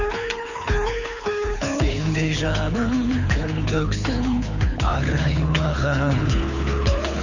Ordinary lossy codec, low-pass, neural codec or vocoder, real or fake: none; 7.2 kHz; codec, 24 kHz, 6 kbps, HILCodec; fake